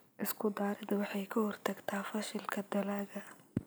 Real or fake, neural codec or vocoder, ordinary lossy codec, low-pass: real; none; none; none